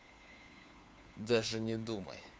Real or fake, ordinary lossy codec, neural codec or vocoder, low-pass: fake; none; codec, 16 kHz, 4 kbps, FunCodec, trained on LibriTTS, 50 frames a second; none